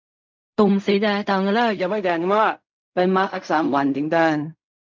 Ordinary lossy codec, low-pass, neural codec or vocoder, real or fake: MP3, 64 kbps; 7.2 kHz; codec, 16 kHz in and 24 kHz out, 0.4 kbps, LongCat-Audio-Codec, fine tuned four codebook decoder; fake